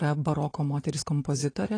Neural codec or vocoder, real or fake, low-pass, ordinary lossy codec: none; real; 9.9 kHz; AAC, 32 kbps